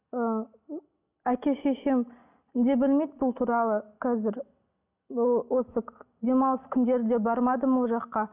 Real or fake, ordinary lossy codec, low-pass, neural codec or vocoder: real; none; 3.6 kHz; none